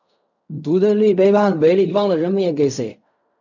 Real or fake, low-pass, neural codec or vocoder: fake; 7.2 kHz; codec, 16 kHz in and 24 kHz out, 0.4 kbps, LongCat-Audio-Codec, fine tuned four codebook decoder